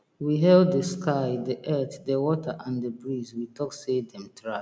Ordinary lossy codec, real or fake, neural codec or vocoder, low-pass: none; real; none; none